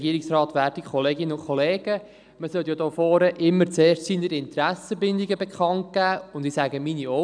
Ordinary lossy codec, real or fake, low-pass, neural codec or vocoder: none; real; 9.9 kHz; none